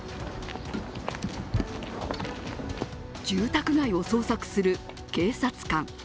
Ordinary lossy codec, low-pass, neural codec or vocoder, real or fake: none; none; none; real